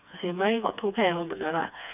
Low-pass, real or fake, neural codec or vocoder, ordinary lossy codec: 3.6 kHz; fake; codec, 16 kHz, 2 kbps, FreqCodec, smaller model; none